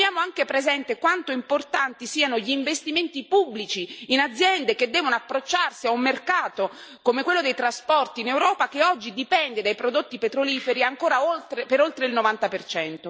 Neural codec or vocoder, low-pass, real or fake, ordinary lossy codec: none; none; real; none